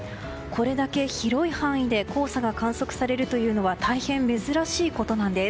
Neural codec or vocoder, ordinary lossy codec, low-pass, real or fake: none; none; none; real